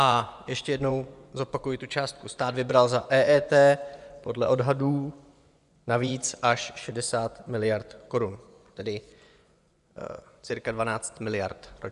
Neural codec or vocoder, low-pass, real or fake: vocoder, 24 kHz, 100 mel bands, Vocos; 10.8 kHz; fake